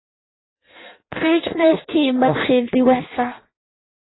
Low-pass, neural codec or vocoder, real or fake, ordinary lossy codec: 7.2 kHz; codec, 16 kHz in and 24 kHz out, 0.6 kbps, FireRedTTS-2 codec; fake; AAC, 16 kbps